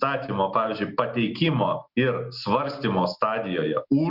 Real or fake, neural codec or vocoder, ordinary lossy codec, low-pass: real; none; Opus, 64 kbps; 5.4 kHz